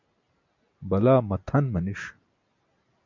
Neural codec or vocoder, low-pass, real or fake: none; 7.2 kHz; real